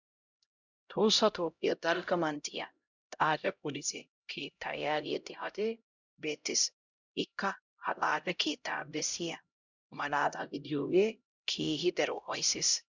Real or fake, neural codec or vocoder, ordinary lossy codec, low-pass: fake; codec, 16 kHz, 0.5 kbps, X-Codec, HuBERT features, trained on LibriSpeech; Opus, 64 kbps; 7.2 kHz